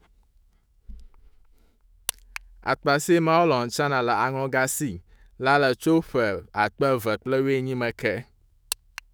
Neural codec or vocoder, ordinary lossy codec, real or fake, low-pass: autoencoder, 48 kHz, 128 numbers a frame, DAC-VAE, trained on Japanese speech; none; fake; none